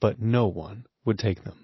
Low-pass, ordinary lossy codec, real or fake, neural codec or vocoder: 7.2 kHz; MP3, 24 kbps; real; none